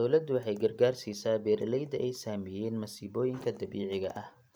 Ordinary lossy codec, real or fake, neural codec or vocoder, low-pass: none; real; none; none